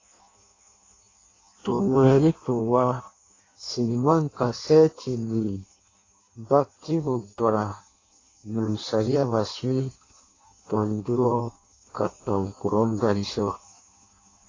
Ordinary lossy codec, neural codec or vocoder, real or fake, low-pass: AAC, 32 kbps; codec, 16 kHz in and 24 kHz out, 0.6 kbps, FireRedTTS-2 codec; fake; 7.2 kHz